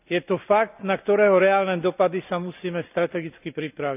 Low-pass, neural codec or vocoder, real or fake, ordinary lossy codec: 3.6 kHz; none; real; none